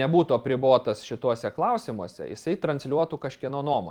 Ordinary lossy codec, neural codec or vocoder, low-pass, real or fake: Opus, 24 kbps; vocoder, 44.1 kHz, 128 mel bands every 256 samples, BigVGAN v2; 19.8 kHz; fake